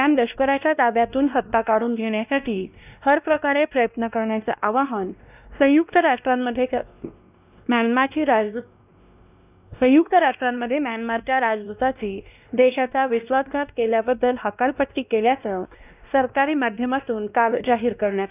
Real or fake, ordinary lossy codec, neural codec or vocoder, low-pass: fake; none; codec, 16 kHz, 1 kbps, X-Codec, WavLM features, trained on Multilingual LibriSpeech; 3.6 kHz